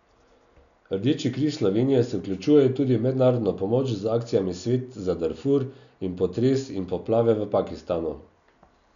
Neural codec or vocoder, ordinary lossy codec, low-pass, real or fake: none; none; 7.2 kHz; real